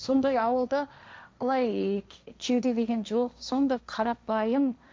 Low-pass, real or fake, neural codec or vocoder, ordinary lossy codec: none; fake; codec, 16 kHz, 1.1 kbps, Voila-Tokenizer; none